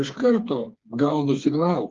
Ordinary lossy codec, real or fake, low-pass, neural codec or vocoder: Opus, 32 kbps; fake; 7.2 kHz; codec, 16 kHz, 4 kbps, FreqCodec, smaller model